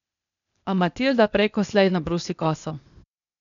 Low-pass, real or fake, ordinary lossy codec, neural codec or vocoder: 7.2 kHz; fake; none; codec, 16 kHz, 0.8 kbps, ZipCodec